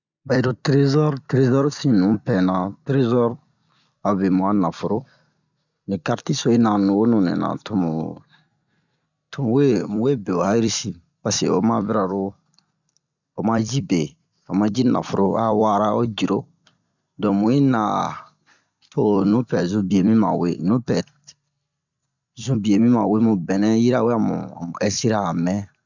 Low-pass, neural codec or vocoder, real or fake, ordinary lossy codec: 7.2 kHz; vocoder, 44.1 kHz, 128 mel bands every 256 samples, BigVGAN v2; fake; none